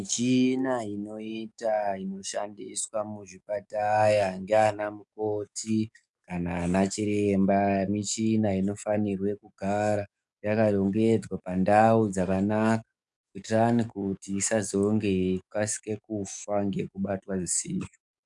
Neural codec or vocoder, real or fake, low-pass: codec, 44.1 kHz, 7.8 kbps, DAC; fake; 10.8 kHz